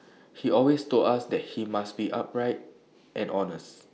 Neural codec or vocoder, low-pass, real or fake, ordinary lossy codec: none; none; real; none